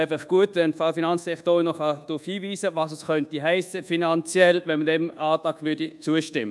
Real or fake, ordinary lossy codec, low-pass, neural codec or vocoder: fake; none; none; codec, 24 kHz, 1.2 kbps, DualCodec